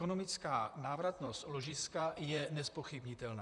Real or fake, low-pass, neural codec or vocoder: fake; 10.8 kHz; vocoder, 44.1 kHz, 128 mel bands, Pupu-Vocoder